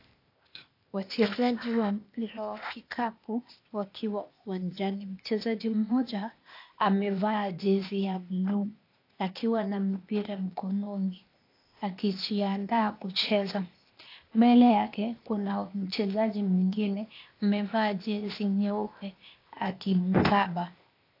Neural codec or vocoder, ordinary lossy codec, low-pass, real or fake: codec, 16 kHz, 0.8 kbps, ZipCodec; AAC, 32 kbps; 5.4 kHz; fake